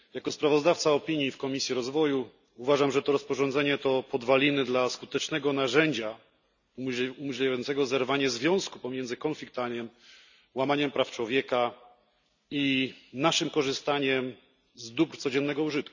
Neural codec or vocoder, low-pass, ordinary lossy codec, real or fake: none; 7.2 kHz; none; real